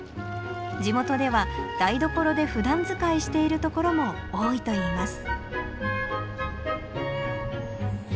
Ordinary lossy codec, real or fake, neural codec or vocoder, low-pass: none; real; none; none